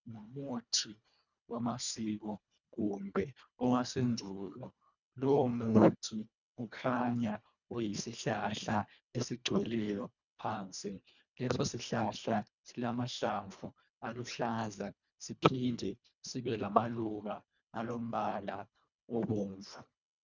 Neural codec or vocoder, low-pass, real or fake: codec, 24 kHz, 1.5 kbps, HILCodec; 7.2 kHz; fake